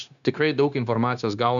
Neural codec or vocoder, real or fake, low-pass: codec, 16 kHz, 0.9 kbps, LongCat-Audio-Codec; fake; 7.2 kHz